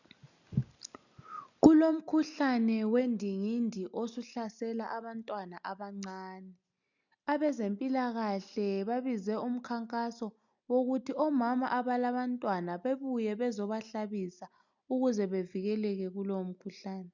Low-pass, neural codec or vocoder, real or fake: 7.2 kHz; none; real